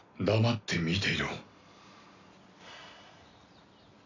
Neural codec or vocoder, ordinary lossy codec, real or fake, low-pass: none; AAC, 48 kbps; real; 7.2 kHz